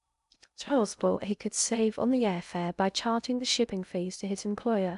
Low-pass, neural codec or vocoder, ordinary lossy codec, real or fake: 10.8 kHz; codec, 16 kHz in and 24 kHz out, 0.6 kbps, FocalCodec, streaming, 2048 codes; none; fake